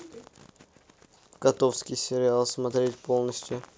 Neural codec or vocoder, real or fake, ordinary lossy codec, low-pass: none; real; none; none